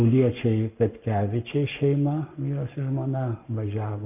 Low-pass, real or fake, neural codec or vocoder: 3.6 kHz; fake; codec, 44.1 kHz, 7.8 kbps, Pupu-Codec